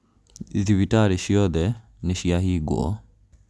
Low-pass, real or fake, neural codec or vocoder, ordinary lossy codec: none; real; none; none